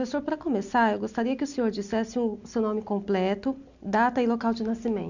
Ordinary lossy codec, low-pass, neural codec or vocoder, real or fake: none; 7.2 kHz; none; real